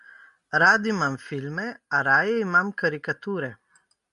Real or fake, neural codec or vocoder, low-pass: real; none; 10.8 kHz